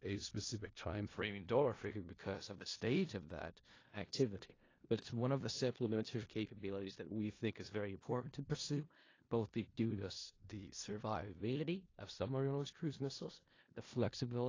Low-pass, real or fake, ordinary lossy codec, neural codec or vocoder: 7.2 kHz; fake; AAC, 32 kbps; codec, 16 kHz in and 24 kHz out, 0.4 kbps, LongCat-Audio-Codec, four codebook decoder